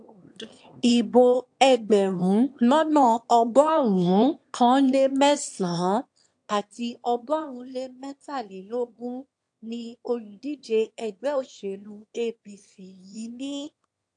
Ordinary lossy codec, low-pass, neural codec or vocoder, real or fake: AAC, 64 kbps; 9.9 kHz; autoencoder, 22.05 kHz, a latent of 192 numbers a frame, VITS, trained on one speaker; fake